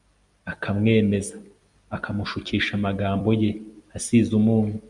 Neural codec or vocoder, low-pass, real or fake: vocoder, 44.1 kHz, 128 mel bands every 256 samples, BigVGAN v2; 10.8 kHz; fake